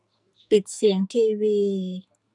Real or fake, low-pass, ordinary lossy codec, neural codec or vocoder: fake; 10.8 kHz; none; codec, 44.1 kHz, 2.6 kbps, SNAC